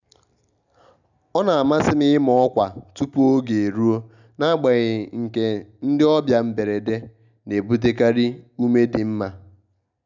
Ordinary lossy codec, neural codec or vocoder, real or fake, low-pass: none; none; real; 7.2 kHz